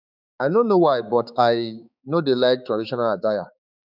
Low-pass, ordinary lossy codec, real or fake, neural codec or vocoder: 5.4 kHz; none; fake; codec, 16 kHz, 4 kbps, X-Codec, HuBERT features, trained on balanced general audio